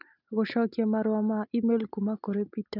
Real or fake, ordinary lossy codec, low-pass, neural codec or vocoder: real; none; 5.4 kHz; none